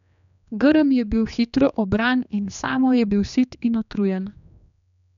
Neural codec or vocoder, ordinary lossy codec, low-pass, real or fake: codec, 16 kHz, 2 kbps, X-Codec, HuBERT features, trained on general audio; none; 7.2 kHz; fake